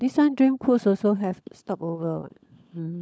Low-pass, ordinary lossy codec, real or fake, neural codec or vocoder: none; none; fake; codec, 16 kHz, 16 kbps, FunCodec, trained on LibriTTS, 50 frames a second